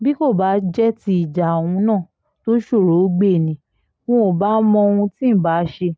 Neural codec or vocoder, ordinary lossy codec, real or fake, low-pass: none; none; real; none